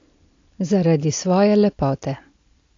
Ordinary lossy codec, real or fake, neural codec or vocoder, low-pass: AAC, 48 kbps; real; none; 7.2 kHz